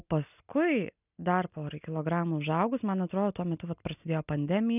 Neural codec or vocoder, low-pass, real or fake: none; 3.6 kHz; real